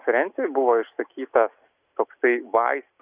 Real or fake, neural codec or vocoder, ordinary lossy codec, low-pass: real; none; Opus, 64 kbps; 3.6 kHz